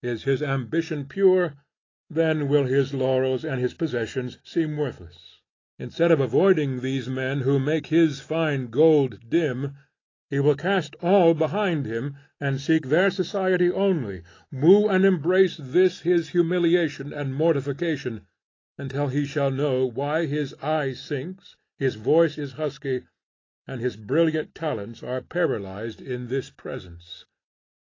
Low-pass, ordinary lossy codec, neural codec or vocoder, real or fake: 7.2 kHz; AAC, 32 kbps; none; real